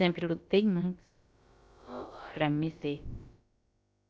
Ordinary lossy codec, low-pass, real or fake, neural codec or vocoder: none; none; fake; codec, 16 kHz, about 1 kbps, DyCAST, with the encoder's durations